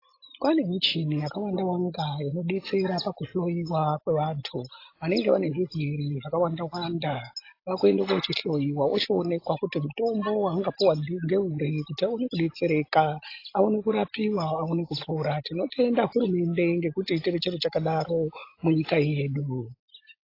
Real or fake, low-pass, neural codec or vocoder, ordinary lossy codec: real; 5.4 kHz; none; AAC, 32 kbps